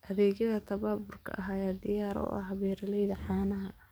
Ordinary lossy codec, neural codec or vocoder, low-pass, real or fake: none; codec, 44.1 kHz, 7.8 kbps, DAC; none; fake